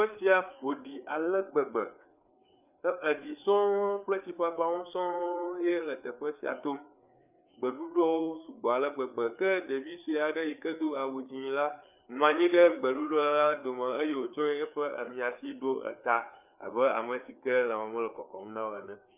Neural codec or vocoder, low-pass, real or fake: codec, 16 kHz, 4 kbps, FreqCodec, larger model; 3.6 kHz; fake